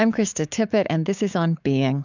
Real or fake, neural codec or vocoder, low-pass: fake; codec, 16 kHz, 6 kbps, DAC; 7.2 kHz